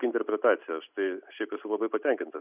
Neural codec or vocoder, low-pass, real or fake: none; 3.6 kHz; real